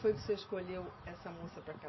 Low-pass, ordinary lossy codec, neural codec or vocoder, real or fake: 7.2 kHz; MP3, 24 kbps; none; real